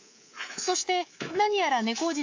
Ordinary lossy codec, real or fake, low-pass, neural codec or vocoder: AAC, 48 kbps; fake; 7.2 kHz; codec, 24 kHz, 3.1 kbps, DualCodec